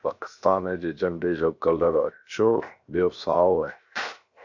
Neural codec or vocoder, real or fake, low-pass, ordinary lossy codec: codec, 16 kHz, 0.7 kbps, FocalCodec; fake; 7.2 kHz; Opus, 64 kbps